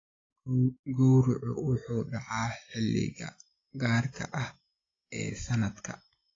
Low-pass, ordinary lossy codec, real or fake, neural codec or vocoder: 7.2 kHz; AAC, 32 kbps; real; none